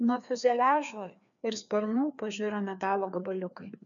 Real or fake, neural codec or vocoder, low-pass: fake; codec, 16 kHz, 2 kbps, FreqCodec, larger model; 7.2 kHz